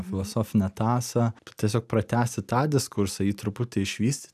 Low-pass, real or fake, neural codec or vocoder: 14.4 kHz; real; none